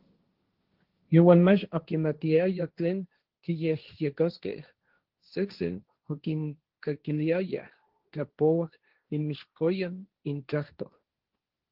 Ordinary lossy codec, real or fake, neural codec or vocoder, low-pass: Opus, 16 kbps; fake; codec, 16 kHz, 1.1 kbps, Voila-Tokenizer; 5.4 kHz